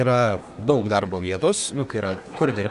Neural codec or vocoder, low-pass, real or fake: codec, 24 kHz, 1 kbps, SNAC; 10.8 kHz; fake